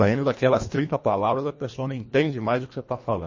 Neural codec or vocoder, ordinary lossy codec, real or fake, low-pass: codec, 24 kHz, 1.5 kbps, HILCodec; MP3, 32 kbps; fake; 7.2 kHz